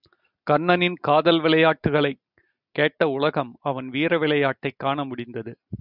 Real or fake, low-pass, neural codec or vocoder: real; 5.4 kHz; none